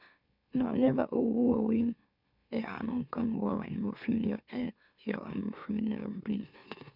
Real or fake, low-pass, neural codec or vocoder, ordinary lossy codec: fake; 5.4 kHz; autoencoder, 44.1 kHz, a latent of 192 numbers a frame, MeloTTS; none